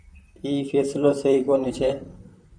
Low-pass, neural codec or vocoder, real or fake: 9.9 kHz; vocoder, 44.1 kHz, 128 mel bands, Pupu-Vocoder; fake